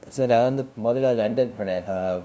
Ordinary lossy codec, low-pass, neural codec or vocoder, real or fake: none; none; codec, 16 kHz, 0.5 kbps, FunCodec, trained on LibriTTS, 25 frames a second; fake